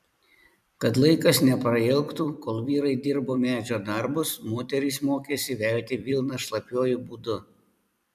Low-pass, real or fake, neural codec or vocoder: 14.4 kHz; fake; vocoder, 44.1 kHz, 128 mel bands every 256 samples, BigVGAN v2